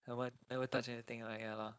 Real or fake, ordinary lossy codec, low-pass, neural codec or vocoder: fake; none; none; codec, 16 kHz, 4.8 kbps, FACodec